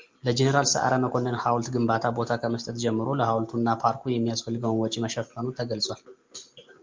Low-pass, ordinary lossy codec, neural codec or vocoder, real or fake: 7.2 kHz; Opus, 24 kbps; none; real